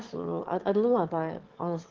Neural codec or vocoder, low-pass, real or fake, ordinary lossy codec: autoencoder, 22.05 kHz, a latent of 192 numbers a frame, VITS, trained on one speaker; 7.2 kHz; fake; Opus, 16 kbps